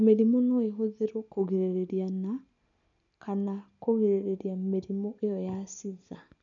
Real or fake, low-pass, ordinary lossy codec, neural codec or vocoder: real; 7.2 kHz; none; none